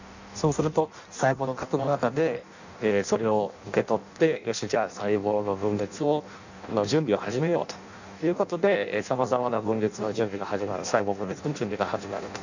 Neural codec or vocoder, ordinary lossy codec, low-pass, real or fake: codec, 16 kHz in and 24 kHz out, 0.6 kbps, FireRedTTS-2 codec; none; 7.2 kHz; fake